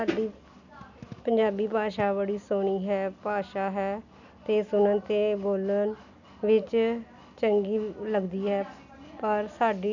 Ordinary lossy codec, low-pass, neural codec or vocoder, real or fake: none; 7.2 kHz; none; real